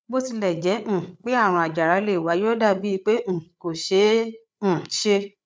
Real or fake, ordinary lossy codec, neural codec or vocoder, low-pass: fake; none; codec, 16 kHz, 8 kbps, FreqCodec, larger model; none